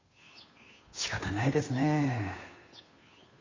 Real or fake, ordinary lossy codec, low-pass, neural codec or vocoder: fake; AAC, 32 kbps; 7.2 kHz; codec, 16 kHz, 2 kbps, FunCodec, trained on Chinese and English, 25 frames a second